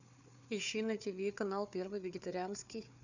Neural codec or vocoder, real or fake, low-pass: codec, 16 kHz, 4 kbps, FunCodec, trained on Chinese and English, 50 frames a second; fake; 7.2 kHz